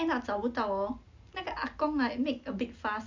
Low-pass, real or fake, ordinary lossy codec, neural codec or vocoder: 7.2 kHz; real; MP3, 64 kbps; none